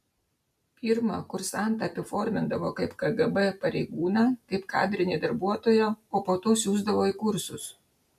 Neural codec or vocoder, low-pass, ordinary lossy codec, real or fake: none; 14.4 kHz; MP3, 96 kbps; real